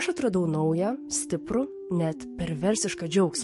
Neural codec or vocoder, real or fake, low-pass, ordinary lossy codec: codec, 44.1 kHz, 7.8 kbps, DAC; fake; 14.4 kHz; MP3, 48 kbps